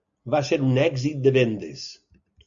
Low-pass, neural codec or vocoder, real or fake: 7.2 kHz; none; real